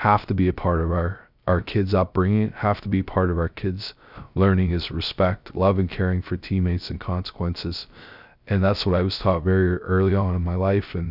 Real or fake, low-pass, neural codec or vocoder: fake; 5.4 kHz; codec, 16 kHz, 0.3 kbps, FocalCodec